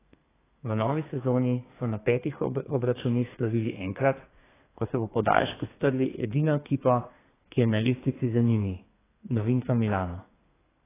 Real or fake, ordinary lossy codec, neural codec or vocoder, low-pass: fake; AAC, 16 kbps; codec, 32 kHz, 1.9 kbps, SNAC; 3.6 kHz